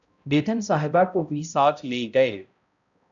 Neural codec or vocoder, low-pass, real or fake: codec, 16 kHz, 0.5 kbps, X-Codec, HuBERT features, trained on balanced general audio; 7.2 kHz; fake